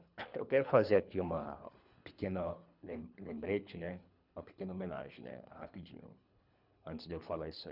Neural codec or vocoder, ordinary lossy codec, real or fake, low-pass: codec, 24 kHz, 3 kbps, HILCodec; none; fake; 5.4 kHz